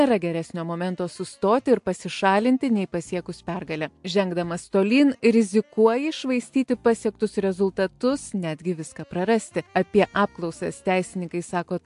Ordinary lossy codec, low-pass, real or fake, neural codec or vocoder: AAC, 64 kbps; 10.8 kHz; real; none